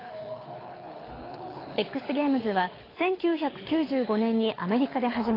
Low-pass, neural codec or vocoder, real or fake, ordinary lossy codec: 5.4 kHz; codec, 24 kHz, 6 kbps, HILCodec; fake; AAC, 24 kbps